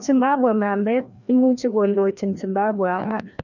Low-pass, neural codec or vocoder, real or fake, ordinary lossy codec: 7.2 kHz; codec, 16 kHz, 1 kbps, FreqCodec, larger model; fake; none